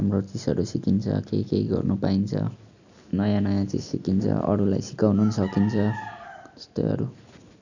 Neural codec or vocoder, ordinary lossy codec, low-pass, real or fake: none; none; 7.2 kHz; real